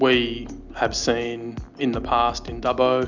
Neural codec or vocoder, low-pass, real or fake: none; 7.2 kHz; real